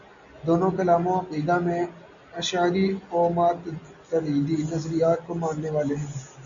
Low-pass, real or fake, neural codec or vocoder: 7.2 kHz; real; none